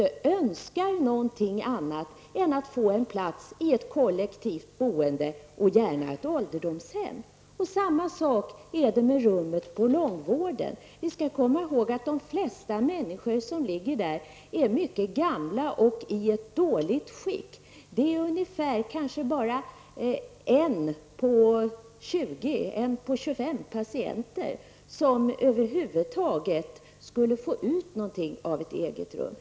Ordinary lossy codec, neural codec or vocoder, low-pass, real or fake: none; none; none; real